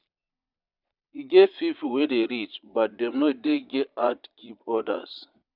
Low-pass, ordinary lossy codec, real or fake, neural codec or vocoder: 5.4 kHz; none; fake; vocoder, 22.05 kHz, 80 mel bands, Vocos